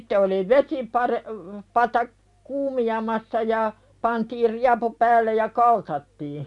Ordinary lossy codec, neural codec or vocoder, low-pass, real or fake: MP3, 96 kbps; none; 10.8 kHz; real